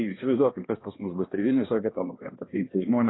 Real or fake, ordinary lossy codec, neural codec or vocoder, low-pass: fake; AAC, 16 kbps; codec, 16 kHz, 1 kbps, X-Codec, HuBERT features, trained on balanced general audio; 7.2 kHz